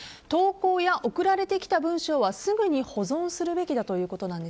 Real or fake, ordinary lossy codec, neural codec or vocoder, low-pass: real; none; none; none